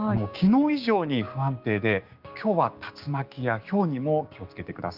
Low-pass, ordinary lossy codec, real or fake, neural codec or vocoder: 5.4 kHz; Opus, 24 kbps; fake; codec, 16 kHz, 6 kbps, DAC